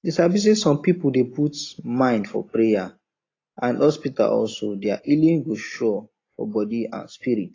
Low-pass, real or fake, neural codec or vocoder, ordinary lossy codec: 7.2 kHz; real; none; AAC, 32 kbps